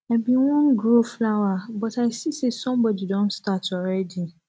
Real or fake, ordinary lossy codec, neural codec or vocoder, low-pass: real; none; none; none